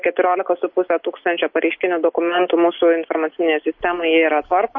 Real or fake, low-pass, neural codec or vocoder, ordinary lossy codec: real; 7.2 kHz; none; MP3, 32 kbps